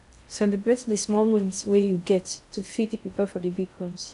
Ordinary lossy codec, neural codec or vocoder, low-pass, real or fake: none; codec, 16 kHz in and 24 kHz out, 0.6 kbps, FocalCodec, streaming, 2048 codes; 10.8 kHz; fake